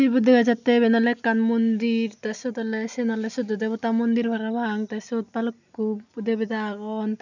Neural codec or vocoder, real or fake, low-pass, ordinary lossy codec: none; real; 7.2 kHz; none